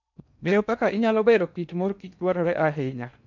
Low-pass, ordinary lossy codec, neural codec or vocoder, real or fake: 7.2 kHz; none; codec, 16 kHz in and 24 kHz out, 0.8 kbps, FocalCodec, streaming, 65536 codes; fake